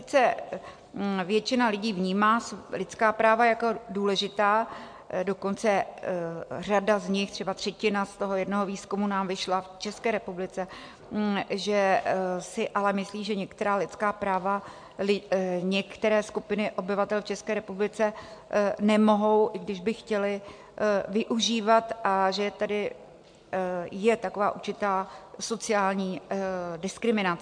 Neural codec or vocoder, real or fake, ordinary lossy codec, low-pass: none; real; MP3, 64 kbps; 9.9 kHz